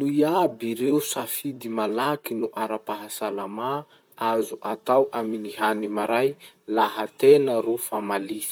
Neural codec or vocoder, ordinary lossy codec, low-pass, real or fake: vocoder, 44.1 kHz, 128 mel bands, Pupu-Vocoder; none; none; fake